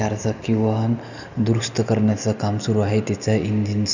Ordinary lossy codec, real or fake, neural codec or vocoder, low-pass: none; real; none; 7.2 kHz